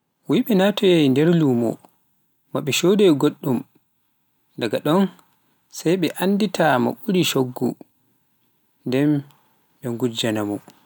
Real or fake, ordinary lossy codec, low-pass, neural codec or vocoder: real; none; none; none